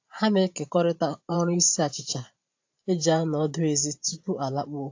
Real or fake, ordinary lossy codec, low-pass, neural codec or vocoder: fake; AAC, 48 kbps; 7.2 kHz; vocoder, 44.1 kHz, 128 mel bands every 512 samples, BigVGAN v2